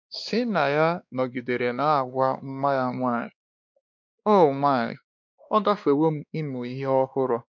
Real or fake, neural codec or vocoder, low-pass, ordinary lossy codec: fake; codec, 16 kHz, 2 kbps, X-Codec, WavLM features, trained on Multilingual LibriSpeech; 7.2 kHz; none